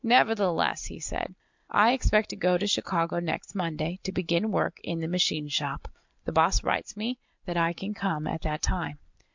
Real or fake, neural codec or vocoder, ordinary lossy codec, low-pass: real; none; MP3, 64 kbps; 7.2 kHz